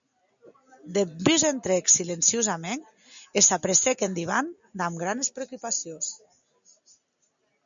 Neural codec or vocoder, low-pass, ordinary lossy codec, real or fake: none; 7.2 kHz; MP3, 64 kbps; real